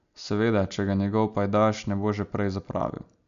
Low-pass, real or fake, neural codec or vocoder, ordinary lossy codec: 7.2 kHz; real; none; none